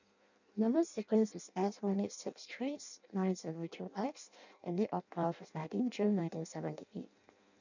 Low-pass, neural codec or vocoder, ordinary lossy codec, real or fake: 7.2 kHz; codec, 16 kHz in and 24 kHz out, 0.6 kbps, FireRedTTS-2 codec; none; fake